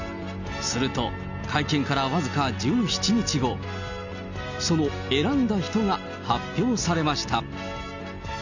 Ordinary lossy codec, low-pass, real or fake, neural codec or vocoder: none; 7.2 kHz; real; none